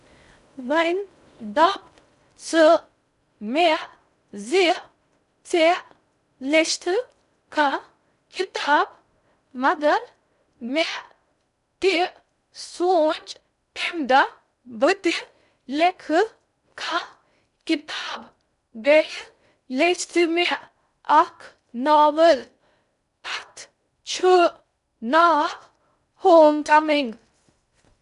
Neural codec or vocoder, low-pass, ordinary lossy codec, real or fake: codec, 16 kHz in and 24 kHz out, 0.6 kbps, FocalCodec, streaming, 2048 codes; 10.8 kHz; none; fake